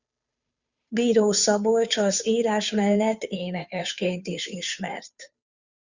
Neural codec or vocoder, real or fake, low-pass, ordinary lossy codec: codec, 16 kHz, 2 kbps, FunCodec, trained on Chinese and English, 25 frames a second; fake; 7.2 kHz; Opus, 64 kbps